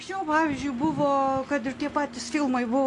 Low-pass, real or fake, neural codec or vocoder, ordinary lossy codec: 10.8 kHz; real; none; AAC, 48 kbps